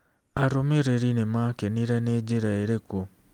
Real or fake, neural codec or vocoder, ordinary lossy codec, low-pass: real; none; Opus, 32 kbps; 19.8 kHz